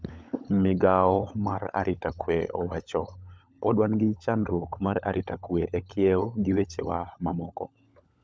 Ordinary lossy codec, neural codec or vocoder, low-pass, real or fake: none; codec, 16 kHz, 16 kbps, FunCodec, trained on LibriTTS, 50 frames a second; none; fake